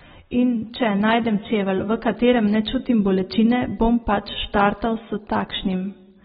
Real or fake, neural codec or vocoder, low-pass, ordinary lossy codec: real; none; 19.8 kHz; AAC, 16 kbps